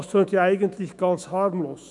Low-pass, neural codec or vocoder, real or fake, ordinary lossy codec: 10.8 kHz; autoencoder, 48 kHz, 128 numbers a frame, DAC-VAE, trained on Japanese speech; fake; none